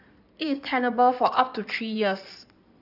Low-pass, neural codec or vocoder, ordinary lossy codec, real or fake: 5.4 kHz; codec, 16 kHz in and 24 kHz out, 2.2 kbps, FireRedTTS-2 codec; none; fake